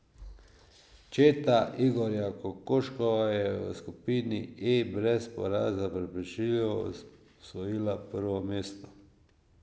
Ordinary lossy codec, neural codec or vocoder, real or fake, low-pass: none; none; real; none